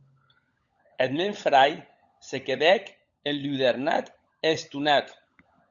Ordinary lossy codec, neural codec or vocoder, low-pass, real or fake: Opus, 64 kbps; codec, 16 kHz, 16 kbps, FunCodec, trained on LibriTTS, 50 frames a second; 7.2 kHz; fake